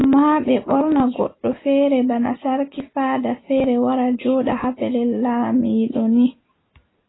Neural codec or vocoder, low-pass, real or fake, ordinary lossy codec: none; 7.2 kHz; real; AAC, 16 kbps